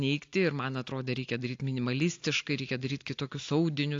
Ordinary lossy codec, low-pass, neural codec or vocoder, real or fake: AAC, 48 kbps; 7.2 kHz; none; real